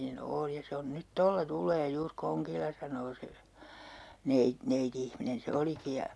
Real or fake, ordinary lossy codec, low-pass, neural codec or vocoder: real; none; none; none